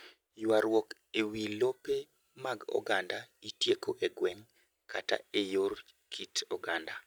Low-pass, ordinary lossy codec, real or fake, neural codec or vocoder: none; none; real; none